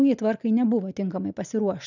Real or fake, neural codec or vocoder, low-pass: real; none; 7.2 kHz